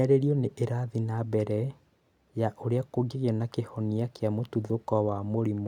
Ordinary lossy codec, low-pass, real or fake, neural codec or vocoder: none; 19.8 kHz; real; none